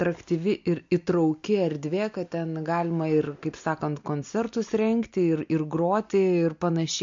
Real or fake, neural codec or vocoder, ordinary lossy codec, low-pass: real; none; MP3, 48 kbps; 7.2 kHz